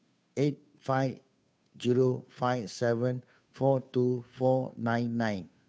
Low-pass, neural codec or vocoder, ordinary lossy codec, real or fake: none; codec, 16 kHz, 2 kbps, FunCodec, trained on Chinese and English, 25 frames a second; none; fake